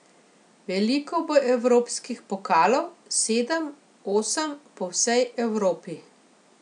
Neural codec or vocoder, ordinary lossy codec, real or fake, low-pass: none; none; real; 9.9 kHz